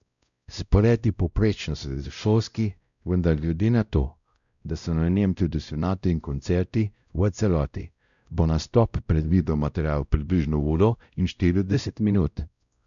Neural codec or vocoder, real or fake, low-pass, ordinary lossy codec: codec, 16 kHz, 0.5 kbps, X-Codec, WavLM features, trained on Multilingual LibriSpeech; fake; 7.2 kHz; none